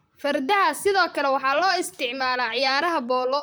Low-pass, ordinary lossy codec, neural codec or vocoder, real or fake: none; none; vocoder, 44.1 kHz, 128 mel bands, Pupu-Vocoder; fake